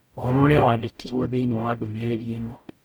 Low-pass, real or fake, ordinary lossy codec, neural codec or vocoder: none; fake; none; codec, 44.1 kHz, 0.9 kbps, DAC